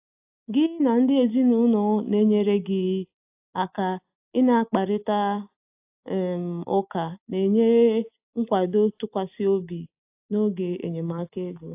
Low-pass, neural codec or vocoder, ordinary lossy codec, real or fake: 3.6 kHz; none; AAC, 32 kbps; real